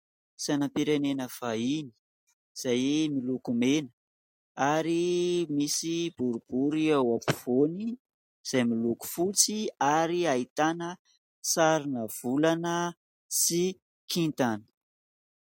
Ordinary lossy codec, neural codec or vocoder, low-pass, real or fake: MP3, 64 kbps; none; 19.8 kHz; real